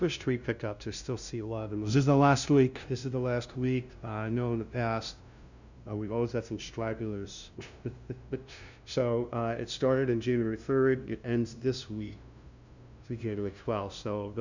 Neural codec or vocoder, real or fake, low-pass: codec, 16 kHz, 0.5 kbps, FunCodec, trained on LibriTTS, 25 frames a second; fake; 7.2 kHz